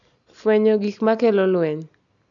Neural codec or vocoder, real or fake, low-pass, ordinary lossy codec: none; real; 7.2 kHz; none